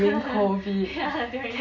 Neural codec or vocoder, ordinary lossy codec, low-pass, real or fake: none; none; 7.2 kHz; real